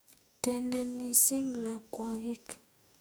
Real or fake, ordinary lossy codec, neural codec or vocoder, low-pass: fake; none; codec, 44.1 kHz, 2.6 kbps, DAC; none